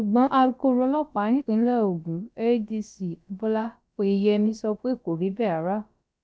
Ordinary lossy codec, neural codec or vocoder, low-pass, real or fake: none; codec, 16 kHz, about 1 kbps, DyCAST, with the encoder's durations; none; fake